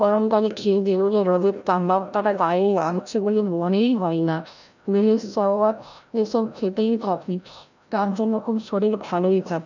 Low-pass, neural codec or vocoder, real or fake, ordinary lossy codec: 7.2 kHz; codec, 16 kHz, 0.5 kbps, FreqCodec, larger model; fake; none